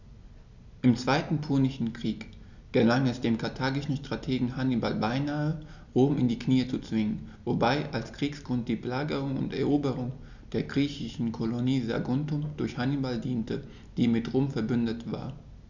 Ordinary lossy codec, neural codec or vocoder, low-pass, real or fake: none; none; 7.2 kHz; real